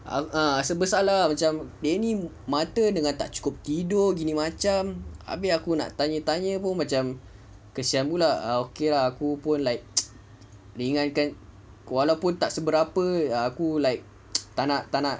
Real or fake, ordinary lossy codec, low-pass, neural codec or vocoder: real; none; none; none